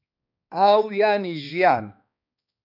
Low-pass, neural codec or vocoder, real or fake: 5.4 kHz; codec, 16 kHz, 2 kbps, X-Codec, HuBERT features, trained on balanced general audio; fake